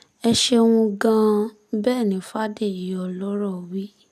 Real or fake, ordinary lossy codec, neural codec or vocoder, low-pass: real; none; none; 14.4 kHz